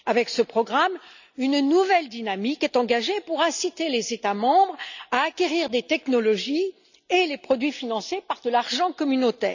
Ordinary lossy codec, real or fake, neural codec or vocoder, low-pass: none; real; none; 7.2 kHz